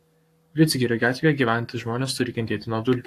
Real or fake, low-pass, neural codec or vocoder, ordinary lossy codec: fake; 14.4 kHz; codec, 44.1 kHz, 7.8 kbps, Pupu-Codec; AAC, 64 kbps